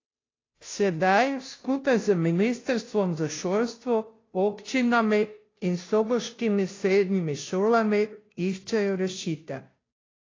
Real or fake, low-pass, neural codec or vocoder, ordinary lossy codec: fake; 7.2 kHz; codec, 16 kHz, 0.5 kbps, FunCodec, trained on Chinese and English, 25 frames a second; AAC, 32 kbps